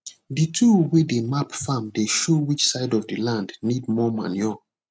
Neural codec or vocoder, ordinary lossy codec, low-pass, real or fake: none; none; none; real